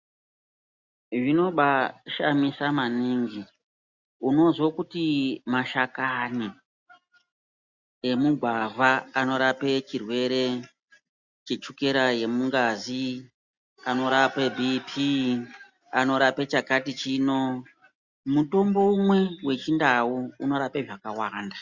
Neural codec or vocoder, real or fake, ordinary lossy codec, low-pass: none; real; Opus, 64 kbps; 7.2 kHz